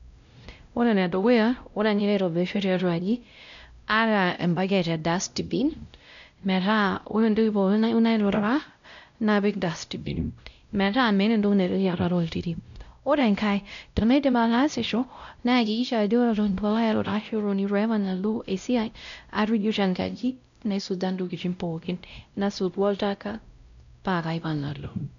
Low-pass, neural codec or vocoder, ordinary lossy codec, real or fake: 7.2 kHz; codec, 16 kHz, 0.5 kbps, X-Codec, WavLM features, trained on Multilingual LibriSpeech; none; fake